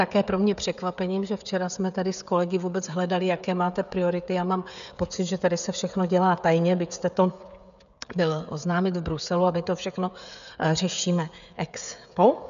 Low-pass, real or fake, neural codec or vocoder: 7.2 kHz; fake; codec, 16 kHz, 16 kbps, FreqCodec, smaller model